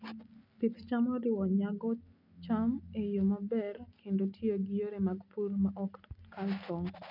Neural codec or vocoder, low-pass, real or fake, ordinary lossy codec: none; 5.4 kHz; real; none